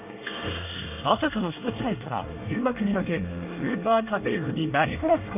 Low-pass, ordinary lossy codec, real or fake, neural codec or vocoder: 3.6 kHz; none; fake; codec, 24 kHz, 1 kbps, SNAC